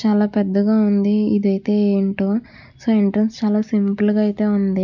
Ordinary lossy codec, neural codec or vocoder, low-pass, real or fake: none; none; 7.2 kHz; real